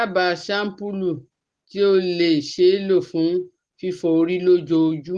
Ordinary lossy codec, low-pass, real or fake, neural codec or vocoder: Opus, 16 kbps; 9.9 kHz; real; none